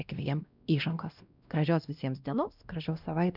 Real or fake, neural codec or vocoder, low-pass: fake; codec, 16 kHz, 1 kbps, X-Codec, WavLM features, trained on Multilingual LibriSpeech; 5.4 kHz